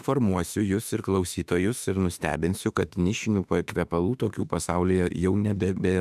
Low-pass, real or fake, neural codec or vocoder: 14.4 kHz; fake; autoencoder, 48 kHz, 32 numbers a frame, DAC-VAE, trained on Japanese speech